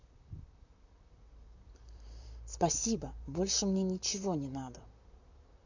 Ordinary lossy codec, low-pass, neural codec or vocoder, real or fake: none; 7.2 kHz; vocoder, 44.1 kHz, 128 mel bands, Pupu-Vocoder; fake